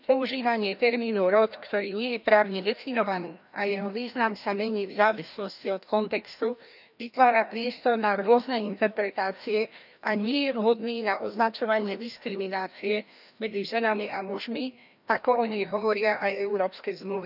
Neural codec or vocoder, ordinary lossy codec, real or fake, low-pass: codec, 16 kHz, 1 kbps, FreqCodec, larger model; none; fake; 5.4 kHz